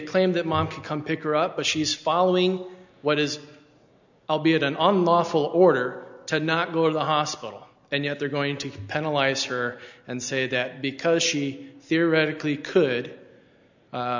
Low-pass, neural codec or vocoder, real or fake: 7.2 kHz; none; real